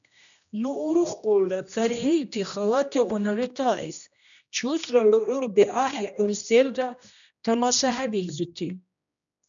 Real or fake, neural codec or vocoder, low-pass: fake; codec, 16 kHz, 1 kbps, X-Codec, HuBERT features, trained on general audio; 7.2 kHz